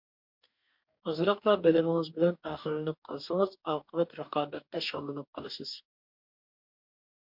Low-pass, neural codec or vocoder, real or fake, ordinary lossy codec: 5.4 kHz; codec, 44.1 kHz, 2.6 kbps, DAC; fake; MP3, 48 kbps